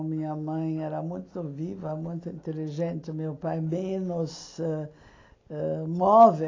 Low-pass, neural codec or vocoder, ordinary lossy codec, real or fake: 7.2 kHz; none; AAC, 32 kbps; real